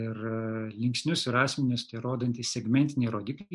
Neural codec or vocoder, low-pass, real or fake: none; 10.8 kHz; real